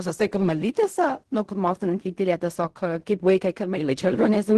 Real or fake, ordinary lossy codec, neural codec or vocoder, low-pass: fake; Opus, 16 kbps; codec, 16 kHz in and 24 kHz out, 0.4 kbps, LongCat-Audio-Codec, fine tuned four codebook decoder; 10.8 kHz